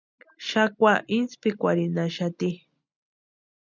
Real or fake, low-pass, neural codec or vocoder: real; 7.2 kHz; none